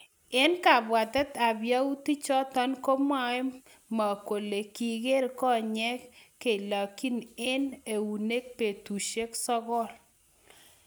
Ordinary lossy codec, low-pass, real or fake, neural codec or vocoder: none; none; real; none